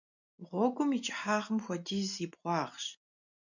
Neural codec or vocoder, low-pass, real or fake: none; 7.2 kHz; real